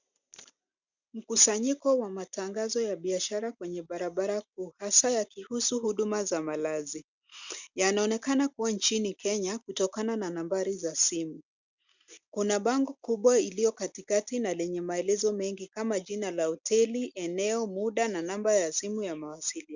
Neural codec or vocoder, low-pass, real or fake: none; 7.2 kHz; real